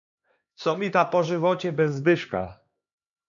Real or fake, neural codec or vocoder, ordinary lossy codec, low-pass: fake; codec, 16 kHz, 2 kbps, X-Codec, HuBERT features, trained on LibriSpeech; MP3, 96 kbps; 7.2 kHz